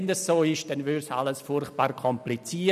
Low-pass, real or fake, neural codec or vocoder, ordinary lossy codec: 14.4 kHz; real; none; none